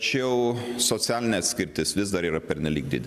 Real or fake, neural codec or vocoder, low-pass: real; none; 14.4 kHz